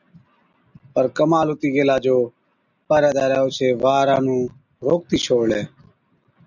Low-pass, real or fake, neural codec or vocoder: 7.2 kHz; real; none